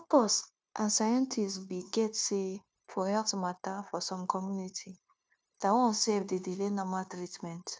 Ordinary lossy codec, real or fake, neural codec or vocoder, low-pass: none; fake; codec, 16 kHz, 0.9 kbps, LongCat-Audio-Codec; none